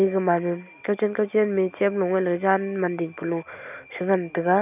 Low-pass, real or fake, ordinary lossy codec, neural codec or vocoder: 3.6 kHz; real; none; none